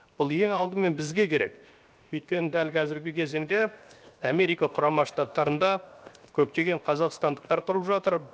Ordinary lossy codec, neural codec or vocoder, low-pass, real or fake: none; codec, 16 kHz, 0.7 kbps, FocalCodec; none; fake